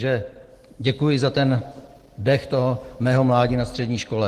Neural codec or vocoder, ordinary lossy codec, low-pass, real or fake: none; Opus, 16 kbps; 14.4 kHz; real